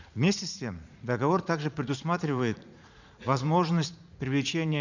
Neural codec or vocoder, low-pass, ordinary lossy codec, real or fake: vocoder, 44.1 kHz, 80 mel bands, Vocos; 7.2 kHz; none; fake